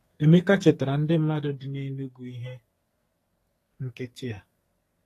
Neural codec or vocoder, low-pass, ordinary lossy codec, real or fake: codec, 44.1 kHz, 2.6 kbps, SNAC; 14.4 kHz; AAC, 48 kbps; fake